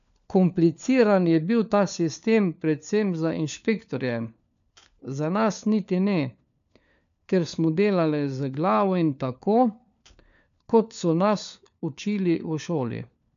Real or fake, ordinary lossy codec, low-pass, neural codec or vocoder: fake; none; 7.2 kHz; codec, 16 kHz, 4 kbps, FunCodec, trained on LibriTTS, 50 frames a second